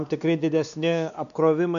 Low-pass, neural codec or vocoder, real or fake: 7.2 kHz; codec, 16 kHz, 2 kbps, X-Codec, WavLM features, trained on Multilingual LibriSpeech; fake